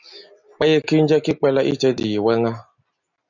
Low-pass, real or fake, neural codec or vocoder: 7.2 kHz; real; none